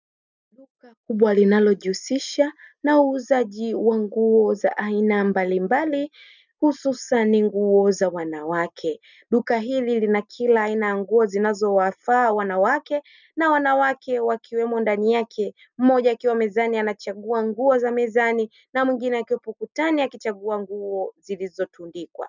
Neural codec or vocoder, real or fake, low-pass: none; real; 7.2 kHz